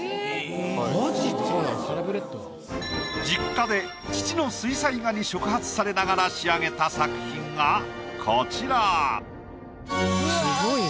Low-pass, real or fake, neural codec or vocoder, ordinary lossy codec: none; real; none; none